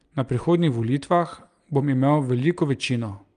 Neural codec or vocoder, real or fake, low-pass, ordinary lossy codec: none; real; 9.9 kHz; Opus, 32 kbps